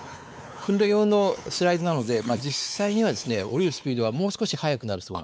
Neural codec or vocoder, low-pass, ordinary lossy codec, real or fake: codec, 16 kHz, 4 kbps, X-Codec, WavLM features, trained on Multilingual LibriSpeech; none; none; fake